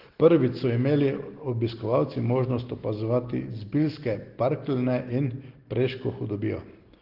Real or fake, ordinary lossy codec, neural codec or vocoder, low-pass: real; Opus, 16 kbps; none; 5.4 kHz